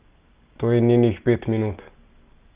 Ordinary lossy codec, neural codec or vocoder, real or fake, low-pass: Opus, 32 kbps; none; real; 3.6 kHz